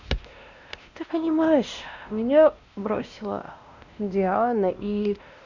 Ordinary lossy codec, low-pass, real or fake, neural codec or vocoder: none; 7.2 kHz; fake; codec, 16 kHz, 1 kbps, X-Codec, WavLM features, trained on Multilingual LibriSpeech